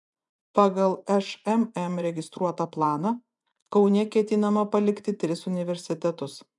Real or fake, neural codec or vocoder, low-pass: fake; vocoder, 24 kHz, 100 mel bands, Vocos; 10.8 kHz